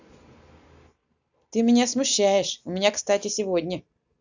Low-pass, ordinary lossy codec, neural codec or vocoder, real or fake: 7.2 kHz; none; none; real